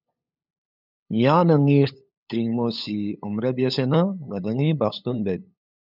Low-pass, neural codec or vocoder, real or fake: 5.4 kHz; codec, 16 kHz, 8 kbps, FunCodec, trained on LibriTTS, 25 frames a second; fake